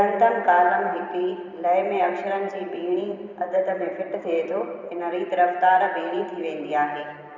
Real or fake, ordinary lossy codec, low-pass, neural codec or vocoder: fake; none; 7.2 kHz; vocoder, 44.1 kHz, 128 mel bands every 512 samples, BigVGAN v2